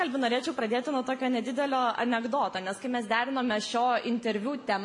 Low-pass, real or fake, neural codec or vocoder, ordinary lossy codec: 10.8 kHz; real; none; MP3, 48 kbps